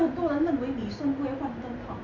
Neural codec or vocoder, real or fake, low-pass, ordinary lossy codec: none; real; 7.2 kHz; Opus, 64 kbps